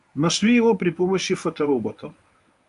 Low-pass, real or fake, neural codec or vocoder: 10.8 kHz; fake; codec, 24 kHz, 0.9 kbps, WavTokenizer, medium speech release version 1